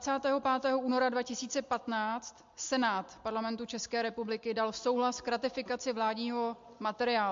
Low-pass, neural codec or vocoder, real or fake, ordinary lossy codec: 7.2 kHz; none; real; MP3, 48 kbps